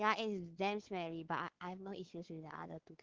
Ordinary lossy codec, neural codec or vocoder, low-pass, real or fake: Opus, 16 kbps; codec, 16 kHz, 4 kbps, X-Codec, WavLM features, trained on Multilingual LibriSpeech; 7.2 kHz; fake